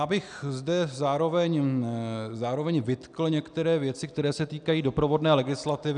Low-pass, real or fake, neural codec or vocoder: 9.9 kHz; real; none